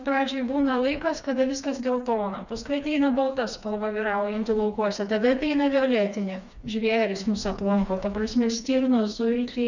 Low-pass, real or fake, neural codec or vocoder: 7.2 kHz; fake; codec, 16 kHz, 2 kbps, FreqCodec, smaller model